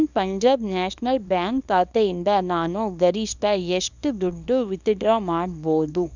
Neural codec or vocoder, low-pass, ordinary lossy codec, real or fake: codec, 24 kHz, 0.9 kbps, WavTokenizer, small release; 7.2 kHz; none; fake